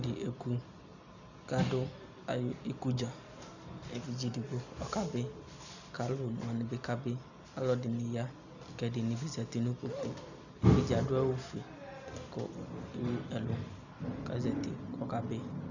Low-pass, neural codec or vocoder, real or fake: 7.2 kHz; none; real